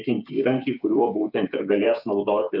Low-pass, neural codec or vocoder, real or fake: 5.4 kHz; codec, 16 kHz, 8 kbps, FreqCodec, smaller model; fake